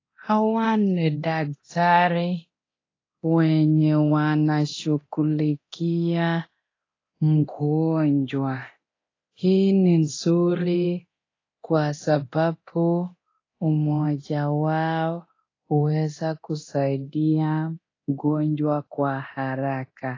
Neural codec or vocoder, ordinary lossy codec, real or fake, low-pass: codec, 24 kHz, 0.9 kbps, DualCodec; AAC, 32 kbps; fake; 7.2 kHz